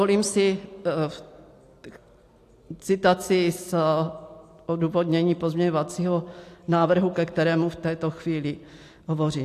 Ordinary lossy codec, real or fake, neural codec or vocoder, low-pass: AAC, 64 kbps; real; none; 14.4 kHz